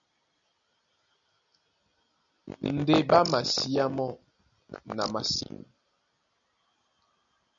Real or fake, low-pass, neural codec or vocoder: real; 7.2 kHz; none